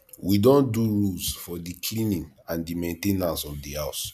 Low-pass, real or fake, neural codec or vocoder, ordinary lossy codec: 14.4 kHz; real; none; none